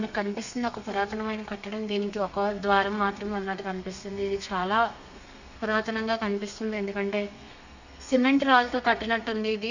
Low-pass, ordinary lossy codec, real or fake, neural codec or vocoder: 7.2 kHz; none; fake; codec, 32 kHz, 1.9 kbps, SNAC